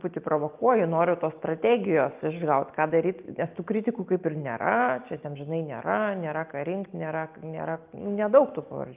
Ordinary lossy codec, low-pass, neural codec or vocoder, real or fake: Opus, 32 kbps; 3.6 kHz; none; real